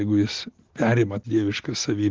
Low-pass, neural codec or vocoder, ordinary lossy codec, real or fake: 7.2 kHz; none; Opus, 16 kbps; real